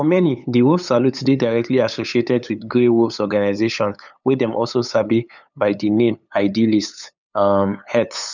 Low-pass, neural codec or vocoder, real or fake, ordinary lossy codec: 7.2 kHz; codec, 16 kHz, 8 kbps, FunCodec, trained on LibriTTS, 25 frames a second; fake; none